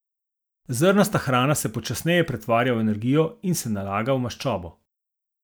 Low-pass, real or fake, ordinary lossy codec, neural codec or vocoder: none; real; none; none